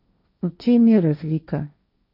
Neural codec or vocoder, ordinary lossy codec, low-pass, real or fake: codec, 16 kHz, 1.1 kbps, Voila-Tokenizer; none; 5.4 kHz; fake